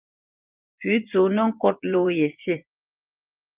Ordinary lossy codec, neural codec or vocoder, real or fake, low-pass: Opus, 24 kbps; vocoder, 24 kHz, 100 mel bands, Vocos; fake; 3.6 kHz